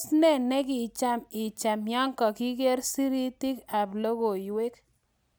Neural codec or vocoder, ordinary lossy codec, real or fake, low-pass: none; none; real; none